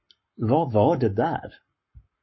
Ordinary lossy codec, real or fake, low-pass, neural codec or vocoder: MP3, 24 kbps; fake; 7.2 kHz; codec, 44.1 kHz, 7.8 kbps, Pupu-Codec